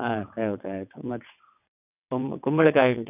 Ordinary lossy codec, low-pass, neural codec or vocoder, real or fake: none; 3.6 kHz; vocoder, 22.05 kHz, 80 mel bands, WaveNeXt; fake